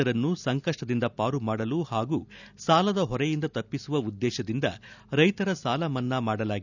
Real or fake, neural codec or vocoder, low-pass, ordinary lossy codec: real; none; 7.2 kHz; none